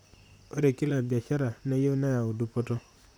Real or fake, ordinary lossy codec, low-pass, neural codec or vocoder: fake; none; none; vocoder, 44.1 kHz, 128 mel bands, Pupu-Vocoder